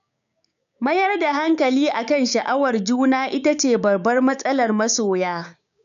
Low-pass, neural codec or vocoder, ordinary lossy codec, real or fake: 7.2 kHz; codec, 16 kHz, 6 kbps, DAC; none; fake